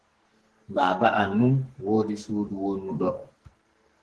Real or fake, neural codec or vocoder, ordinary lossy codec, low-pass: fake; codec, 44.1 kHz, 2.6 kbps, SNAC; Opus, 16 kbps; 10.8 kHz